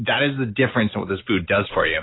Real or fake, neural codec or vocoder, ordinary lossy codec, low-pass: real; none; AAC, 16 kbps; 7.2 kHz